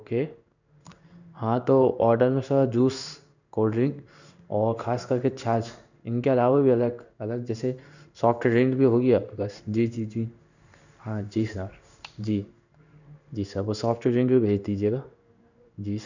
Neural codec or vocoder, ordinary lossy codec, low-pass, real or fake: codec, 16 kHz in and 24 kHz out, 1 kbps, XY-Tokenizer; none; 7.2 kHz; fake